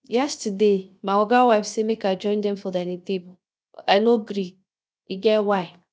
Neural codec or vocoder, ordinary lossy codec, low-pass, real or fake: codec, 16 kHz, 0.7 kbps, FocalCodec; none; none; fake